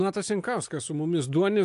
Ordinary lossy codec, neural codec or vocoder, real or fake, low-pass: AAC, 64 kbps; none; real; 10.8 kHz